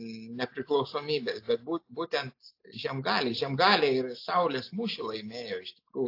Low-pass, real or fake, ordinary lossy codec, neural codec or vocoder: 5.4 kHz; real; AAC, 32 kbps; none